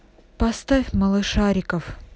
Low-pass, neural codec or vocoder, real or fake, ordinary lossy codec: none; none; real; none